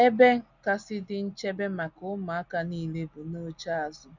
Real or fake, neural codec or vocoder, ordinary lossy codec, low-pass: real; none; none; 7.2 kHz